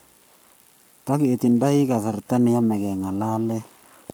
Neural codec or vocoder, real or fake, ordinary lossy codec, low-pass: codec, 44.1 kHz, 7.8 kbps, Pupu-Codec; fake; none; none